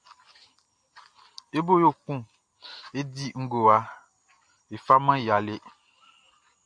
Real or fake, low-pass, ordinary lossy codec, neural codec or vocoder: real; 9.9 kHz; AAC, 64 kbps; none